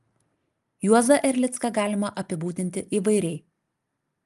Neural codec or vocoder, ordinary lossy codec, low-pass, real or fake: none; Opus, 32 kbps; 14.4 kHz; real